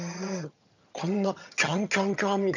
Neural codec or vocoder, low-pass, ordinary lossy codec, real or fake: vocoder, 22.05 kHz, 80 mel bands, HiFi-GAN; 7.2 kHz; none; fake